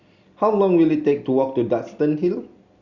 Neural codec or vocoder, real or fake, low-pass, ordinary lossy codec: none; real; 7.2 kHz; Opus, 64 kbps